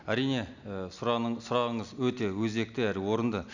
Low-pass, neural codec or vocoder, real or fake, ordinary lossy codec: 7.2 kHz; none; real; none